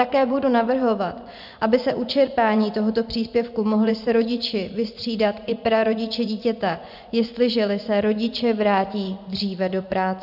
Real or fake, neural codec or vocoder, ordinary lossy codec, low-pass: fake; vocoder, 24 kHz, 100 mel bands, Vocos; AAC, 48 kbps; 5.4 kHz